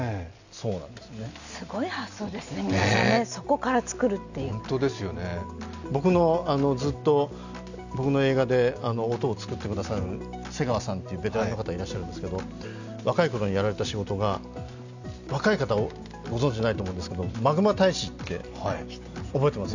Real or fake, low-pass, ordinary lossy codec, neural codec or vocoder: real; 7.2 kHz; none; none